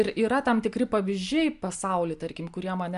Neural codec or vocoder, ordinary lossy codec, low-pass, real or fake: none; Opus, 64 kbps; 10.8 kHz; real